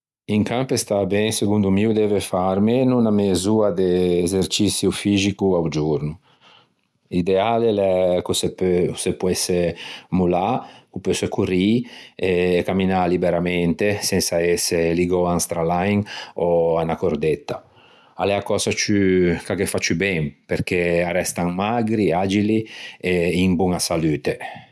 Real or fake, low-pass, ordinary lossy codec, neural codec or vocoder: real; none; none; none